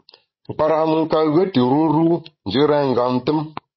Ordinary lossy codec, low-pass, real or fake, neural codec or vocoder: MP3, 24 kbps; 7.2 kHz; fake; codec, 16 kHz, 16 kbps, FreqCodec, larger model